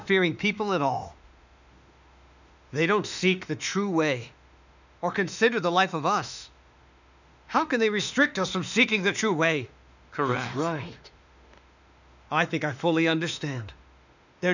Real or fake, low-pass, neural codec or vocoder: fake; 7.2 kHz; autoencoder, 48 kHz, 32 numbers a frame, DAC-VAE, trained on Japanese speech